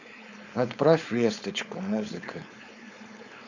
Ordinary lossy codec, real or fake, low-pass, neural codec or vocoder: none; fake; 7.2 kHz; codec, 16 kHz, 4.8 kbps, FACodec